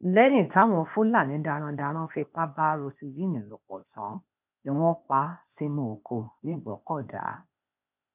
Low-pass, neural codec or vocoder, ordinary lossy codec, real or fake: 3.6 kHz; codec, 16 kHz, 0.8 kbps, ZipCodec; none; fake